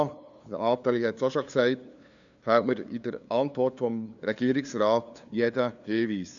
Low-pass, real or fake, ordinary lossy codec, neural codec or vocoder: 7.2 kHz; fake; none; codec, 16 kHz, 2 kbps, FunCodec, trained on LibriTTS, 25 frames a second